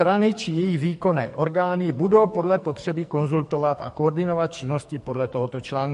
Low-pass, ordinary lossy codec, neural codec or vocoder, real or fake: 14.4 kHz; MP3, 48 kbps; codec, 44.1 kHz, 2.6 kbps, SNAC; fake